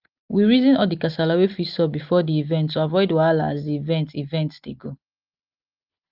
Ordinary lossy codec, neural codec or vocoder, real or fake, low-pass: Opus, 24 kbps; none; real; 5.4 kHz